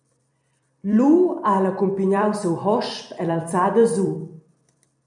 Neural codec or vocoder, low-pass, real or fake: vocoder, 44.1 kHz, 128 mel bands every 256 samples, BigVGAN v2; 10.8 kHz; fake